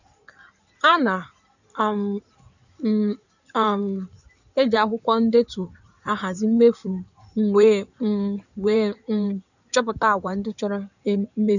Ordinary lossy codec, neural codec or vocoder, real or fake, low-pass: none; codec, 16 kHz in and 24 kHz out, 2.2 kbps, FireRedTTS-2 codec; fake; 7.2 kHz